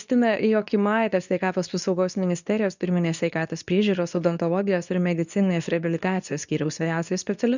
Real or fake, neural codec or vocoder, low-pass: fake; codec, 24 kHz, 0.9 kbps, WavTokenizer, medium speech release version 2; 7.2 kHz